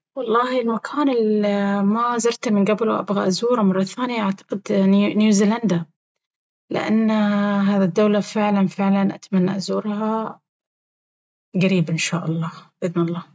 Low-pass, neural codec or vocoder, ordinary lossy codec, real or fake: none; none; none; real